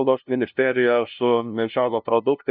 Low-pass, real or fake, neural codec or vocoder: 5.4 kHz; fake; codec, 16 kHz, 0.5 kbps, FunCodec, trained on LibriTTS, 25 frames a second